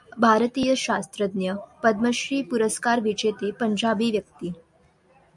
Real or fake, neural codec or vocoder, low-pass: real; none; 10.8 kHz